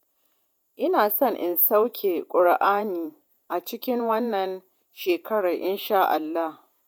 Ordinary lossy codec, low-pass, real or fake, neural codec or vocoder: none; none; fake; vocoder, 48 kHz, 128 mel bands, Vocos